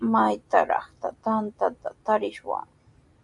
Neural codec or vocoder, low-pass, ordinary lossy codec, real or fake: none; 10.8 kHz; AAC, 64 kbps; real